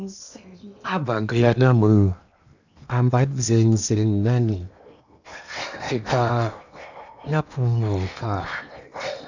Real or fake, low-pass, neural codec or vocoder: fake; 7.2 kHz; codec, 16 kHz in and 24 kHz out, 0.8 kbps, FocalCodec, streaming, 65536 codes